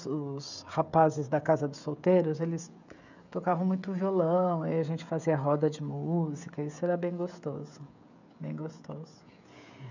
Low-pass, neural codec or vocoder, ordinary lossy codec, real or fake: 7.2 kHz; codec, 16 kHz, 16 kbps, FreqCodec, smaller model; none; fake